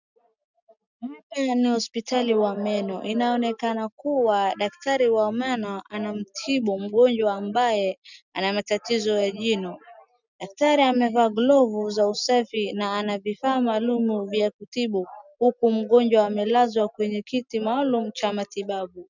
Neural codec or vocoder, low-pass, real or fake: none; 7.2 kHz; real